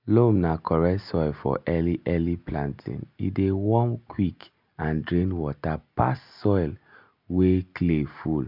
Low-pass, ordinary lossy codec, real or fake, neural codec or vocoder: 5.4 kHz; MP3, 48 kbps; real; none